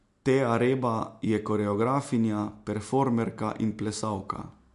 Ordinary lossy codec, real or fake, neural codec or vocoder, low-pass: MP3, 64 kbps; real; none; 10.8 kHz